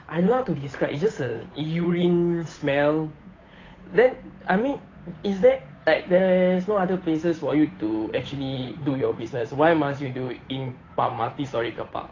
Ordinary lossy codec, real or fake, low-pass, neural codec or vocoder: AAC, 32 kbps; fake; 7.2 kHz; codec, 16 kHz, 8 kbps, FunCodec, trained on Chinese and English, 25 frames a second